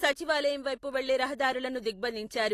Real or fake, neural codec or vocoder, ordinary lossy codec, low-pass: real; none; AAC, 48 kbps; 14.4 kHz